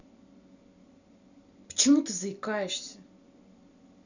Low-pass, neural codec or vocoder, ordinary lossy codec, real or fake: 7.2 kHz; none; none; real